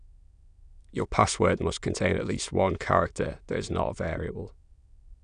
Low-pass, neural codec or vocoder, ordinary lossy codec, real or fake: 9.9 kHz; autoencoder, 22.05 kHz, a latent of 192 numbers a frame, VITS, trained on many speakers; none; fake